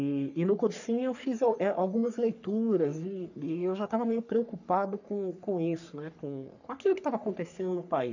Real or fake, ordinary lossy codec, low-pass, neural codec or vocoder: fake; none; 7.2 kHz; codec, 44.1 kHz, 3.4 kbps, Pupu-Codec